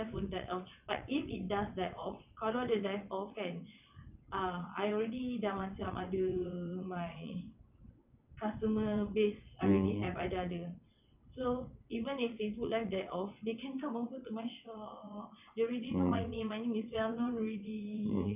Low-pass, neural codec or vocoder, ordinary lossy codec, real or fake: 3.6 kHz; vocoder, 22.05 kHz, 80 mel bands, WaveNeXt; none; fake